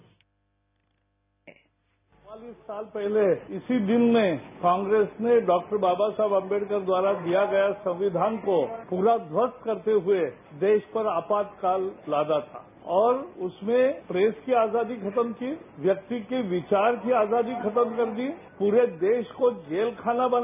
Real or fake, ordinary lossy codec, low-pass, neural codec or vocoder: real; none; 3.6 kHz; none